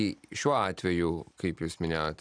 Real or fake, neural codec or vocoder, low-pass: real; none; 9.9 kHz